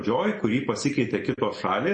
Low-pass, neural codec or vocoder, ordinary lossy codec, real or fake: 10.8 kHz; none; MP3, 32 kbps; real